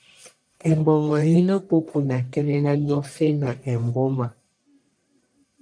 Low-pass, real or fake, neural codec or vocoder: 9.9 kHz; fake; codec, 44.1 kHz, 1.7 kbps, Pupu-Codec